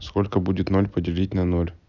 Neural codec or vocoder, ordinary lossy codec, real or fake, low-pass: none; none; real; 7.2 kHz